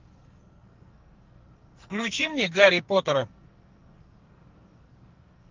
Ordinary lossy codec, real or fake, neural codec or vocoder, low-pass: Opus, 32 kbps; fake; codec, 44.1 kHz, 2.6 kbps, SNAC; 7.2 kHz